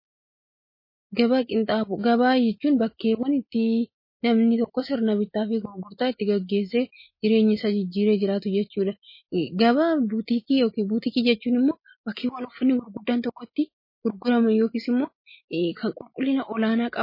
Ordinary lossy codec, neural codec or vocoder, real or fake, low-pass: MP3, 24 kbps; none; real; 5.4 kHz